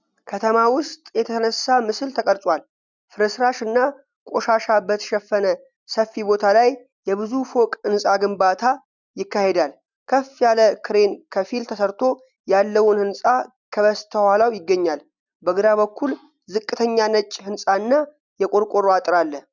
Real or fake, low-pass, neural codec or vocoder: real; 7.2 kHz; none